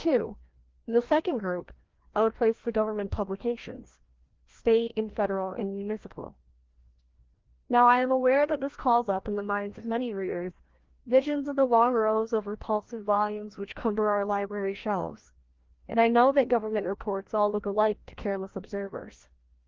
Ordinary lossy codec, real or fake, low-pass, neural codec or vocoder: Opus, 16 kbps; fake; 7.2 kHz; codec, 16 kHz, 1 kbps, FreqCodec, larger model